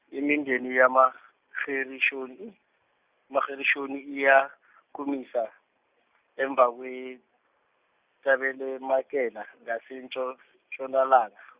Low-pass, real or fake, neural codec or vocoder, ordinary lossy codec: 3.6 kHz; real; none; Opus, 64 kbps